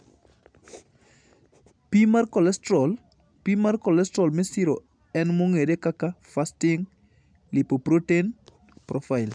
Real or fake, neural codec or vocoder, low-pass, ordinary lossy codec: real; none; 9.9 kHz; none